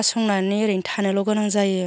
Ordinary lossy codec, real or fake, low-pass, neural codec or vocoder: none; real; none; none